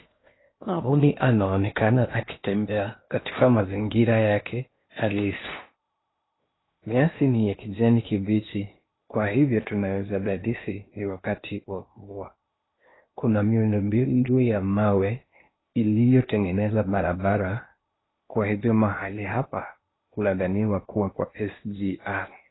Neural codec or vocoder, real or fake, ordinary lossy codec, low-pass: codec, 16 kHz in and 24 kHz out, 0.8 kbps, FocalCodec, streaming, 65536 codes; fake; AAC, 16 kbps; 7.2 kHz